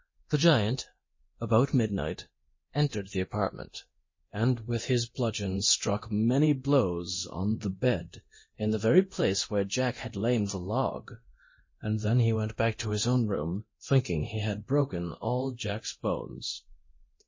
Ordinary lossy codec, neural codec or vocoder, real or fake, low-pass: MP3, 32 kbps; codec, 24 kHz, 0.9 kbps, DualCodec; fake; 7.2 kHz